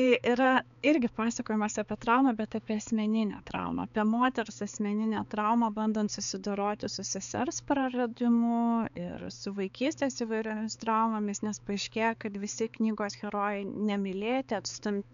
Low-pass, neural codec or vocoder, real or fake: 7.2 kHz; codec, 16 kHz, 4 kbps, X-Codec, HuBERT features, trained on balanced general audio; fake